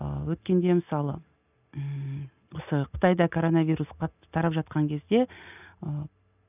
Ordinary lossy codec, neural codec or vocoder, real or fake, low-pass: none; none; real; 3.6 kHz